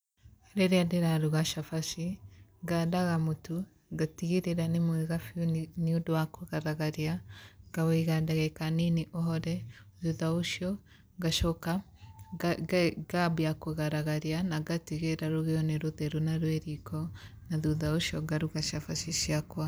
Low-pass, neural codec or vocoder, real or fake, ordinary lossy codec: none; vocoder, 44.1 kHz, 128 mel bands every 256 samples, BigVGAN v2; fake; none